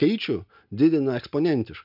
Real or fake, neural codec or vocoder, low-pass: real; none; 5.4 kHz